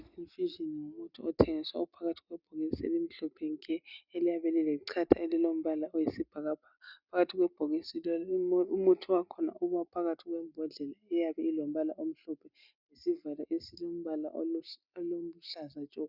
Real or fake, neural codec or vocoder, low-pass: real; none; 5.4 kHz